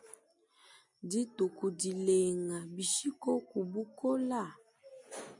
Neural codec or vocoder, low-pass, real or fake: none; 10.8 kHz; real